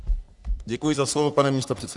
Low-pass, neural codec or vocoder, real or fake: 10.8 kHz; codec, 44.1 kHz, 3.4 kbps, Pupu-Codec; fake